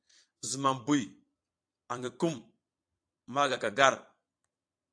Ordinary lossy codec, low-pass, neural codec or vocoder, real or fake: AAC, 64 kbps; 9.9 kHz; vocoder, 22.05 kHz, 80 mel bands, Vocos; fake